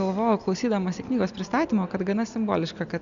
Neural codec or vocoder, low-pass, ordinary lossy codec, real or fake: none; 7.2 kHz; MP3, 96 kbps; real